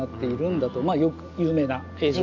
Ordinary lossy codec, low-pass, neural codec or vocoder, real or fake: none; 7.2 kHz; none; real